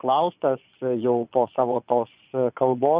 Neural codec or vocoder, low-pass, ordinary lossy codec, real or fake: vocoder, 22.05 kHz, 80 mel bands, Vocos; 3.6 kHz; Opus, 64 kbps; fake